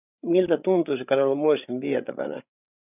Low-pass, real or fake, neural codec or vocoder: 3.6 kHz; fake; codec, 16 kHz, 8 kbps, FreqCodec, larger model